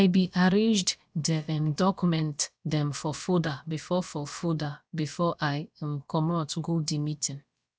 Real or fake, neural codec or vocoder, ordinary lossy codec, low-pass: fake; codec, 16 kHz, about 1 kbps, DyCAST, with the encoder's durations; none; none